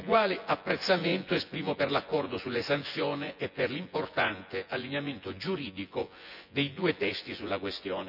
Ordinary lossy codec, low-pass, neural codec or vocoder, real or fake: none; 5.4 kHz; vocoder, 24 kHz, 100 mel bands, Vocos; fake